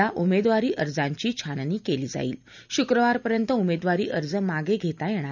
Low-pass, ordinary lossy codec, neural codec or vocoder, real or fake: 7.2 kHz; none; none; real